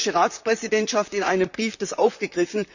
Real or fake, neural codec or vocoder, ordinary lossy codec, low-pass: fake; vocoder, 44.1 kHz, 128 mel bands, Pupu-Vocoder; none; 7.2 kHz